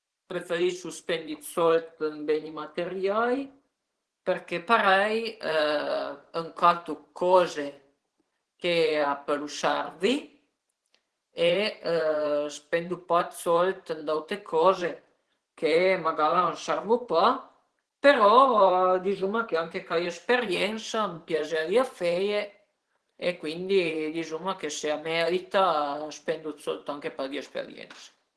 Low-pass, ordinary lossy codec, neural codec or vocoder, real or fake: 10.8 kHz; Opus, 16 kbps; vocoder, 44.1 kHz, 128 mel bands every 512 samples, BigVGAN v2; fake